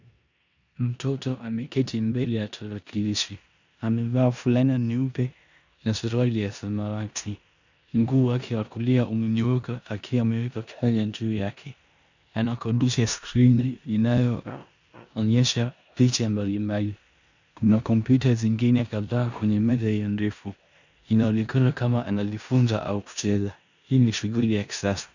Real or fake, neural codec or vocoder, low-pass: fake; codec, 16 kHz in and 24 kHz out, 0.9 kbps, LongCat-Audio-Codec, four codebook decoder; 7.2 kHz